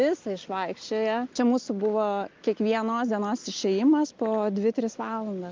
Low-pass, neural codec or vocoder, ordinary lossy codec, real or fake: 7.2 kHz; none; Opus, 24 kbps; real